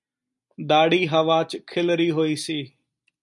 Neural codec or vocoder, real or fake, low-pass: none; real; 10.8 kHz